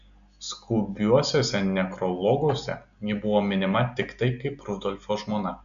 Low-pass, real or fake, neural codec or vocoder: 7.2 kHz; real; none